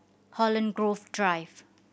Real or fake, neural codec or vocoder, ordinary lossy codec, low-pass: real; none; none; none